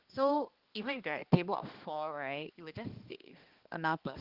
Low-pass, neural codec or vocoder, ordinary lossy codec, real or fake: 5.4 kHz; codec, 16 kHz, 2 kbps, X-Codec, HuBERT features, trained on balanced general audio; Opus, 16 kbps; fake